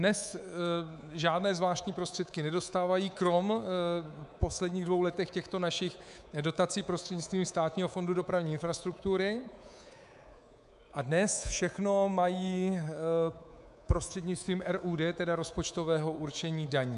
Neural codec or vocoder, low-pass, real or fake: codec, 24 kHz, 3.1 kbps, DualCodec; 10.8 kHz; fake